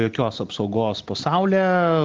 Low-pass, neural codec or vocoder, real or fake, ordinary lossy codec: 7.2 kHz; none; real; Opus, 16 kbps